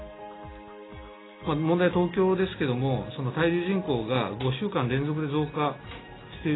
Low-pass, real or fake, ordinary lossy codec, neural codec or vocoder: 7.2 kHz; real; AAC, 16 kbps; none